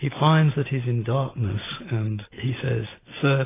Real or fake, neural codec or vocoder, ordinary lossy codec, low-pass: real; none; AAC, 16 kbps; 3.6 kHz